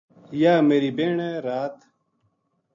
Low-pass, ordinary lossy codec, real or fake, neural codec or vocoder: 7.2 kHz; MP3, 64 kbps; real; none